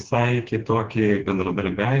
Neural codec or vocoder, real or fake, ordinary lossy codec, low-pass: codec, 16 kHz, 2 kbps, FreqCodec, smaller model; fake; Opus, 16 kbps; 7.2 kHz